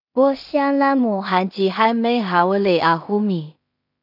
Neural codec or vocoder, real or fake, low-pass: codec, 16 kHz in and 24 kHz out, 0.4 kbps, LongCat-Audio-Codec, two codebook decoder; fake; 5.4 kHz